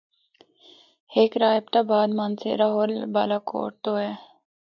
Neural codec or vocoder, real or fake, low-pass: none; real; 7.2 kHz